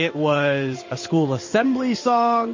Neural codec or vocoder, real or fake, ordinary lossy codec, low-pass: codec, 16 kHz in and 24 kHz out, 1 kbps, XY-Tokenizer; fake; MP3, 32 kbps; 7.2 kHz